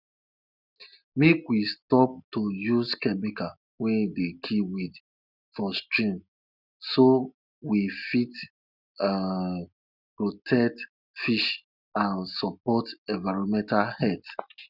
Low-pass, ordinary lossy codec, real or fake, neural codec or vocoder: 5.4 kHz; none; real; none